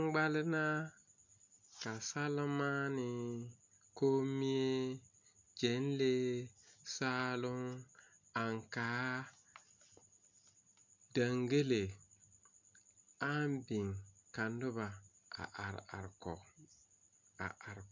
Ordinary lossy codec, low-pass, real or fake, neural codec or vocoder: MP3, 48 kbps; 7.2 kHz; real; none